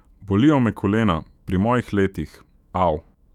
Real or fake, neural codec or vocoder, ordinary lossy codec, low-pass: fake; autoencoder, 48 kHz, 128 numbers a frame, DAC-VAE, trained on Japanese speech; none; 19.8 kHz